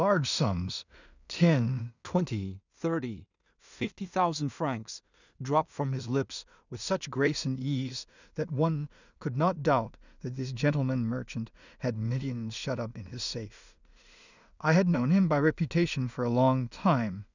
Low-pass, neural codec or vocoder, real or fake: 7.2 kHz; codec, 16 kHz in and 24 kHz out, 0.4 kbps, LongCat-Audio-Codec, two codebook decoder; fake